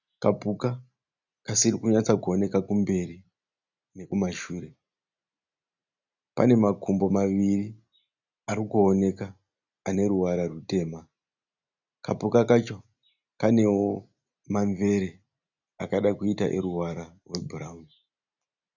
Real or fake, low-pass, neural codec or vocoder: real; 7.2 kHz; none